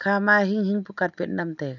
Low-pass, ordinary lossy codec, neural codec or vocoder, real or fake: 7.2 kHz; none; none; real